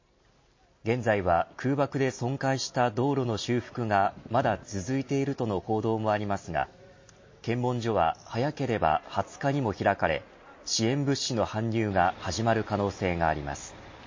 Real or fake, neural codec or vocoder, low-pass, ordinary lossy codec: real; none; 7.2 kHz; MP3, 32 kbps